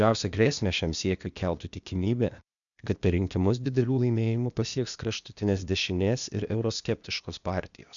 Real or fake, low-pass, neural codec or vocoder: fake; 7.2 kHz; codec, 16 kHz, 0.8 kbps, ZipCodec